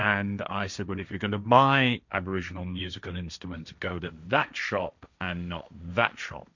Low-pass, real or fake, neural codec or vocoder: 7.2 kHz; fake; codec, 16 kHz, 1.1 kbps, Voila-Tokenizer